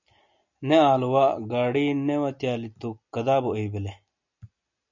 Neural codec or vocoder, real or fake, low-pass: none; real; 7.2 kHz